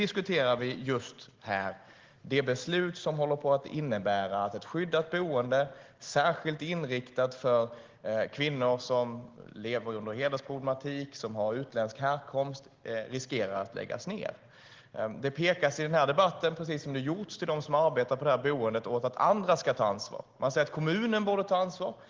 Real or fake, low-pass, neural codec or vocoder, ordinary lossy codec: real; 7.2 kHz; none; Opus, 16 kbps